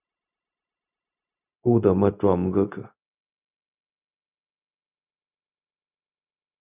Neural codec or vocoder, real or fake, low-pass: codec, 16 kHz, 0.4 kbps, LongCat-Audio-Codec; fake; 3.6 kHz